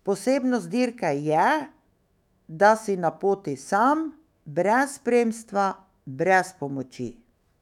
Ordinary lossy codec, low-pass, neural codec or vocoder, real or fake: none; 19.8 kHz; autoencoder, 48 kHz, 128 numbers a frame, DAC-VAE, trained on Japanese speech; fake